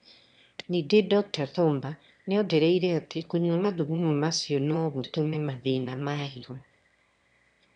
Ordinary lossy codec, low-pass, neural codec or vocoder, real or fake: none; 9.9 kHz; autoencoder, 22.05 kHz, a latent of 192 numbers a frame, VITS, trained on one speaker; fake